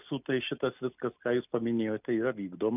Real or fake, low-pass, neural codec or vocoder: real; 3.6 kHz; none